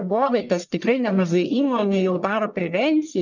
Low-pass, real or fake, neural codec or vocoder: 7.2 kHz; fake; codec, 44.1 kHz, 1.7 kbps, Pupu-Codec